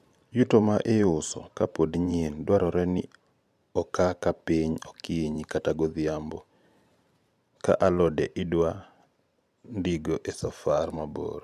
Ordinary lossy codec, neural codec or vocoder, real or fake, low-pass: MP3, 96 kbps; vocoder, 44.1 kHz, 128 mel bands every 512 samples, BigVGAN v2; fake; 14.4 kHz